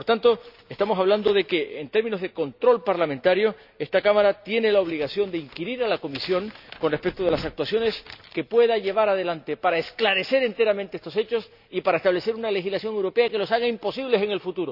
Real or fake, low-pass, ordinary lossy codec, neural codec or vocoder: real; 5.4 kHz; none; none